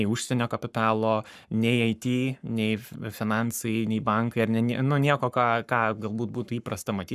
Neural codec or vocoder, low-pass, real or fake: codec, 44.1 kHz, 7.8 kbps, Pupu-Codec; 14.4 kHz; fake